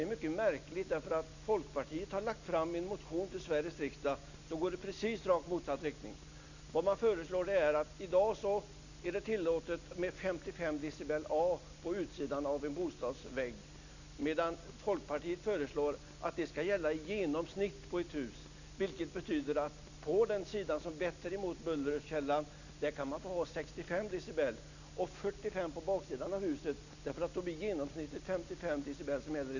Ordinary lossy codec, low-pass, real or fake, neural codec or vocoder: none; 7.2 kHz; real; none